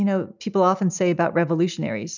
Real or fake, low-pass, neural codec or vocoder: real; 7.2 kHz; none